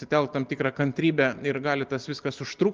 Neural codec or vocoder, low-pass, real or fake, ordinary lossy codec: none; 7.2 kHz; real; Opus, 24 kbps